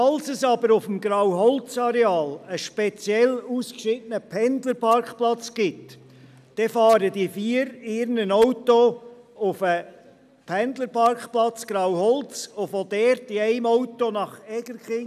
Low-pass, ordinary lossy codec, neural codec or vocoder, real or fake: 14.4 kHz; none; none; real